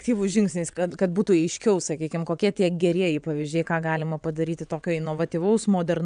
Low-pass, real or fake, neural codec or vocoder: 9.9 kHz; fake; vocoder, 22.05 kHz, 80 mel bands, WaveNeXt